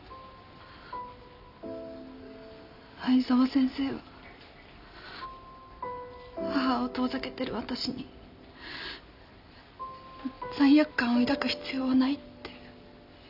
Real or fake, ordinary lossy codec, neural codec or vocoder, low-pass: real; none; none; 5.4 kHz